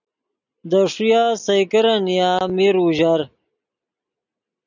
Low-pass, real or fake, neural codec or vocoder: 7.2 kHz; real; none